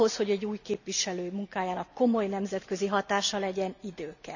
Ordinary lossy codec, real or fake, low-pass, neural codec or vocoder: none; real; 7.2 kHz; none